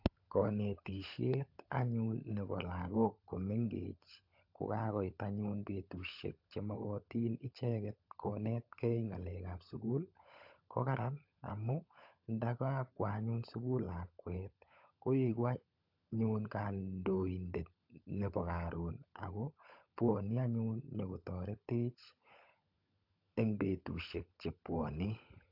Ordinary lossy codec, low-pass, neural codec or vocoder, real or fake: AAC, 48 kbps; 5.4 kHz; codec, 16 kHz, 16 kbps, FunCodec, trained on LibriTTS, 50 frames a second; fake